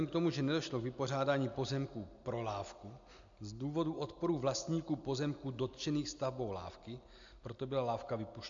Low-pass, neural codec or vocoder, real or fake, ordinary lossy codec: 7.2 kHz; none; real; AAC, 64 kbps